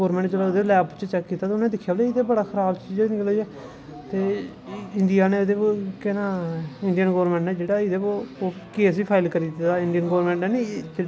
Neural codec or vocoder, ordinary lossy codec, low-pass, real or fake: none; none; none; real